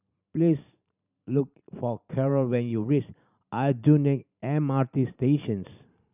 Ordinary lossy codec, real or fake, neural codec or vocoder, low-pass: none; fake; vocoder, 44.1 kHz, 128 mel bands every 512 samples, BigVGAN v2; 3.6 kHz